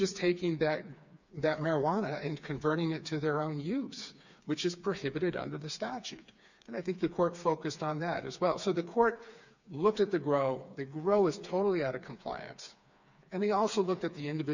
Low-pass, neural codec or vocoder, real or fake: 7.2 kHz; codec, 16 kHz, 4 kbps, FreqCodec, smaller model; fake